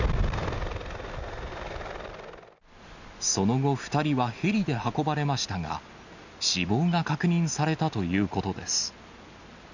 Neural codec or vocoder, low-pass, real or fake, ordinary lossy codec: none; 7.2 kHz; real; none